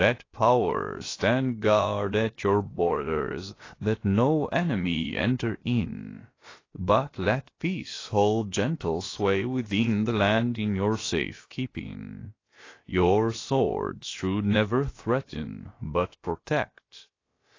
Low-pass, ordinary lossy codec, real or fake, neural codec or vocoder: 7.2 kHz; AAC, 32 kbps; fake; codec, 16 kHz, about 1 kbps, DyCAST, with the encoder's durations